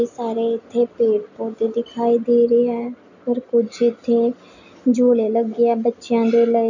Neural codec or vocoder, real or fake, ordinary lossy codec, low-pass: none; real; none; 7.2 kHz